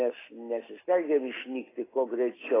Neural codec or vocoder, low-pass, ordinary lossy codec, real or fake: none; 3.6 kHz; AAC, 16 kbps; real